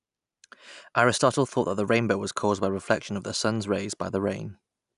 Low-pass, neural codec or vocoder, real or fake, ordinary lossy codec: 10.8 kHz; none; real; none